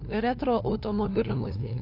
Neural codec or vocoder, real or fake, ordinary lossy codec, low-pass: codec, 16 kHz, 2 kbps, FunCodec, trained on LibriTTS, 25 frames a second; fake; AAC, 32 kbps; 5.4 kHz